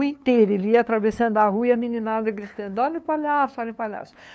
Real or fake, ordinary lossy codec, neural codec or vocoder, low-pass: fake; none; codec, 16 kHz, 2 kbps, FunCodec, trained on LibriTTS, 25 frames a second; none